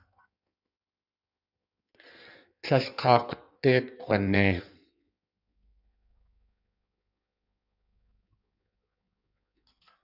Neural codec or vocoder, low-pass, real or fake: codec, 16 kHz in and 24 kHz out, 1.1 kbps, FireRedTTS-2 codec; 5.4 kHz; fake